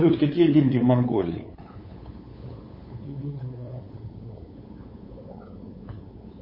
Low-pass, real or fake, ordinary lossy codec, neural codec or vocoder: 5.4 kHz; fake; MP3, 24 kbps; codec, 16 kHz, 8 kbps, FunCodec, trained on LibriTTS, 25 frames a second